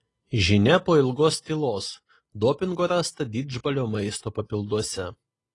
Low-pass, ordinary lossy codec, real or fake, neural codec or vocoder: 10.8 kHz; AAC, 32 kbps; real; none